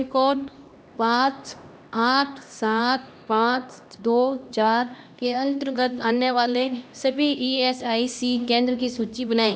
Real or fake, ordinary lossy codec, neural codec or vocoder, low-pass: fake; none; codec, 16 kHz, 1 kbps, X-Codec, HuBERT features, trained on LibriSpeech; none